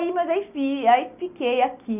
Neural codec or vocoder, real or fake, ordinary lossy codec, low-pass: none; real; none; 3.6 kHz